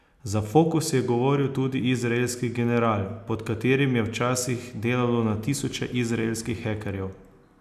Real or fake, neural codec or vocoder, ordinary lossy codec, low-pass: real; none; none; 14.4 kHz